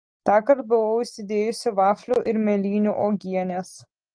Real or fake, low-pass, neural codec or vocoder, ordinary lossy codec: real; 9.9 kHz; none; Opus, 24 kbps